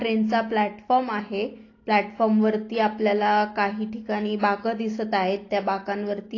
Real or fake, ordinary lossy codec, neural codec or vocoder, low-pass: real; AAC, 32 kbps; none; 7.2 kHz